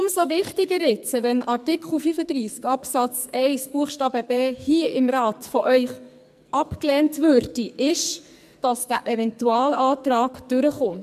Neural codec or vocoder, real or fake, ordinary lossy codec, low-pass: codec, 44.1 kHz, 2.6 kbps, SNAC; fake; MP3, 96 kbps; 14.4 kHz